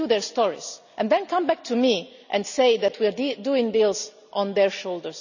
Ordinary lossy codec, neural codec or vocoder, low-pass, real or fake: none; none; 7.2 kHz; real